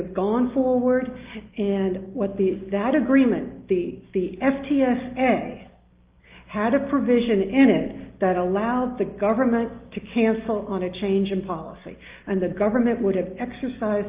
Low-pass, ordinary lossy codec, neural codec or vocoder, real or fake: 3.6 kHz; Opus, 32 kbps; none; real